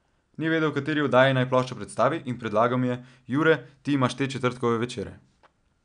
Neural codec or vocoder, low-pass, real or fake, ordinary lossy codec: none; 9.9 kHz; real; none